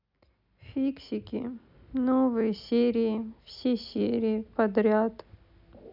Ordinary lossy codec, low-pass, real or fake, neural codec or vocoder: none; 5.4 kHz; real; none